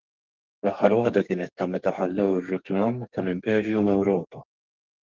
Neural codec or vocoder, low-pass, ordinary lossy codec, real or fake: codec, 32 kHz, 1.9 kbps, SNAC; 7.2 kHz; Opus, 32 kbps; fake